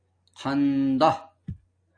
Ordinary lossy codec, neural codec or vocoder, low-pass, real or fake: AAC, 64 kbps; none; 9.9 kHz; real